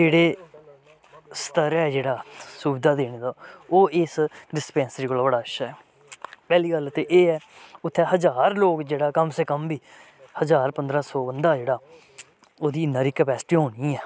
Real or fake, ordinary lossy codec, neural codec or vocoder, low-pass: real; none; none; none